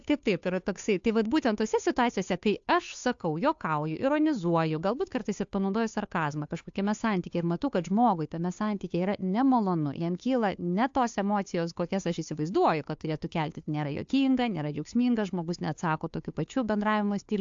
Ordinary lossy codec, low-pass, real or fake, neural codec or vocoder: AAC, 64 kbps; 7.2 kHz; fake; codec, 16 kHz, 2 kbps, FunCodec, trained on Chinese and English, 25 frames a second